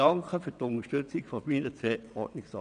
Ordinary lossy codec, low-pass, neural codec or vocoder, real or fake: none; 9.9 kHz; vocoder, 22.05 kHz, 80 mel bands, WaveNeXt; fake